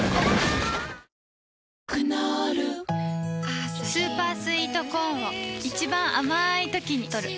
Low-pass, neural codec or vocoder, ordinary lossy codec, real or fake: none; none; none; real